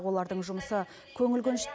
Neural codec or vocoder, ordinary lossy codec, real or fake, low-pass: none; none; real; none